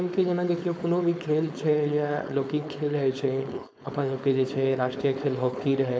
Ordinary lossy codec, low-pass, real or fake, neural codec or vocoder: none; none; fake; codec, 16 kHz, 4.8 kbps, FACodec